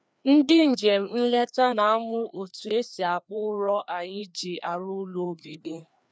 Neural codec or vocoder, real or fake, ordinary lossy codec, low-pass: codec, 16 kHz, 2 kbps, FreqCodec, larger model; fake; none; none